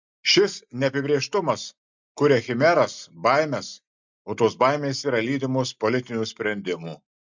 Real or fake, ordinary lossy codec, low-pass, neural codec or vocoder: real; MP3, 64 kbps; 7.2 kHz; none